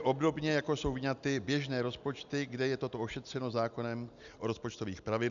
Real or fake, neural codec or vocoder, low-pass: real; none; 7.2 kHz